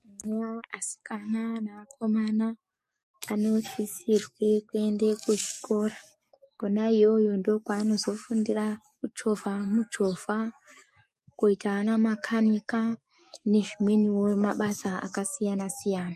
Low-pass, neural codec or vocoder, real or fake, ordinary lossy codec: 14.4 kHz; codec, 44.1 kHz, 7.8 kbps, DAC; fake; MP3, 64 kbps